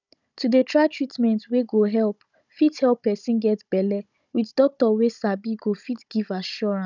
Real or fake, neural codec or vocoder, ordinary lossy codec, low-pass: fake; codec, 16 kHz, 16 kbps, FunCodec, trained on Chinese and English, 50 frames a second; none; 7.2 kHz